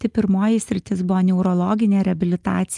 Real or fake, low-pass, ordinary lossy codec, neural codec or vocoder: real; 10.8 kHz; Opus, 32 kbps; none